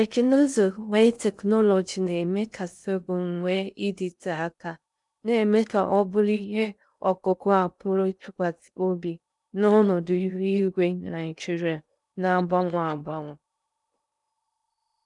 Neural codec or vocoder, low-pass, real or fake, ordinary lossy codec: codec, 16 kHz in and 24 kHz out, 0.6 kbps, FocalCodec, streaming, 2048 codes; 10.8 kHz; fake; none